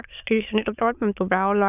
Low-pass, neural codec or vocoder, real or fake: 3.6 kHz; autoencoder, 22.05 kHz, a latent of 192 numbers a frame, VITS, trained on many speakers; fake